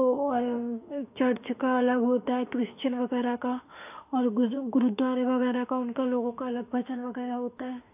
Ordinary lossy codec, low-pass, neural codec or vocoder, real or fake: AAC, 32 kbps; 3.6 kHz; autoencoder, 48 kHz, 32 numbers a frame, DAC-VAE, trained on Japanese speech; fake